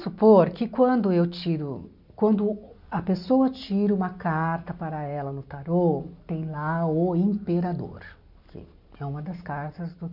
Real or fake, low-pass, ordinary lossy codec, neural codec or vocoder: real; 5.4 kHz; none; none